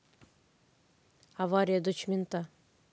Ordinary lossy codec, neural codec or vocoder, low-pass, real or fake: none; none; none; real